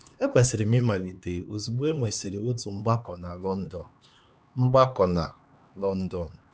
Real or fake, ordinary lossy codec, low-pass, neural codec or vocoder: fake; none; none; codec, 16 kHz, 2 kbps, X-Codec, HuBERT features, trained on LibriSpeech